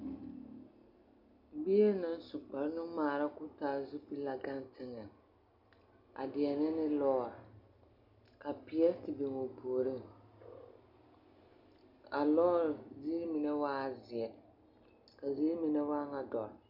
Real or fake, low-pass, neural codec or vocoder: real; 5.4 kHz; none